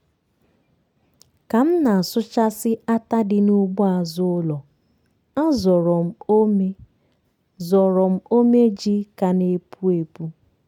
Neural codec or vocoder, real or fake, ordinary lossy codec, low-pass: none; real; none; 19.8 kHz